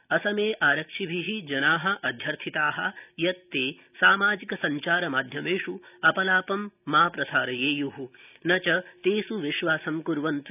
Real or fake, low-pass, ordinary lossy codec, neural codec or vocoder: real; 3.6 kHz; none; none